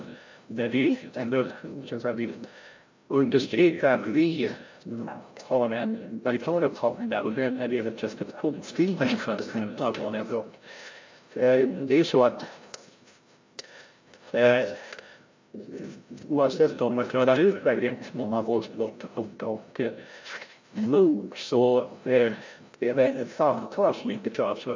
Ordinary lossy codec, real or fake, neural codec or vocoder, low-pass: MP3, 48 kbps; fake; codec, 16 kHz, 0.5 kbps, FreqCodec, larger model; 7.2 kHz